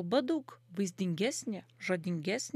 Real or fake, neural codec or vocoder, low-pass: real; none; 14.4 kHz